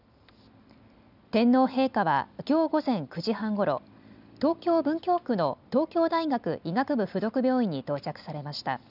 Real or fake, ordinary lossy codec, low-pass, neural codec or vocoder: real; none; 5.4 kHz; none